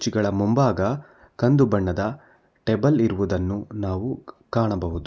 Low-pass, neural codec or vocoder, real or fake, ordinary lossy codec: none; none; real; none